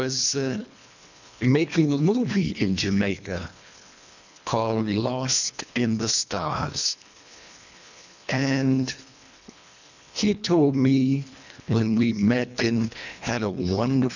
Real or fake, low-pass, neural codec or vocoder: fake; 7.2 kHz; codec, 24 kHz, 1.5 kbps, HILCodec